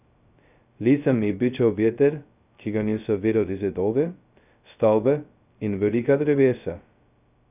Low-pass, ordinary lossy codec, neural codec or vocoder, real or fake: 3.6 kHz; none; codec, 16 kHz, 0.2 kbps, FocalCodec; fake